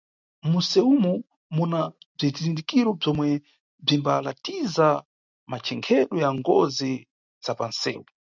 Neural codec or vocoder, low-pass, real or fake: none; 7.2 kHz; real